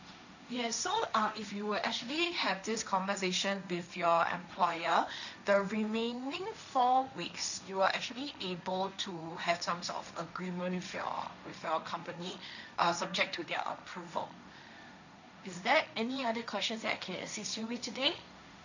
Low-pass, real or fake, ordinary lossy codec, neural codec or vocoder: 7.2 kHz; fake; none; codec, 16 kHz, 1.1 kbps, Voila-Tokenizer